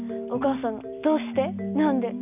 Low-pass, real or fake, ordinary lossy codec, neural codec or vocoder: 3.6 kHz; real; none; none